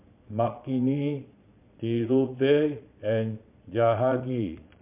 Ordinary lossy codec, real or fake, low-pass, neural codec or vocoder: MP3, 32 kbps; fake; 3.6 kHz; vocoder, 22.05 kHz, 80 mel bands, Vocos